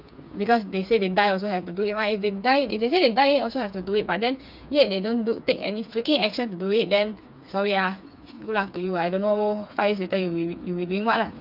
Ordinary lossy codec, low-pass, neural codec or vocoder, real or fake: none; 5.4 kHz; codec, 16 kHz, 4 kbps, FreqCodec, smaller model; fake